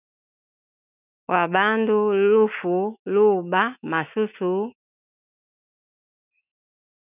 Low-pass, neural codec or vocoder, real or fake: 3.6 kHz; autoencoder, 48 kHz, 128 numbers a frame, DAC-VAE, trained on Japanese speech; fake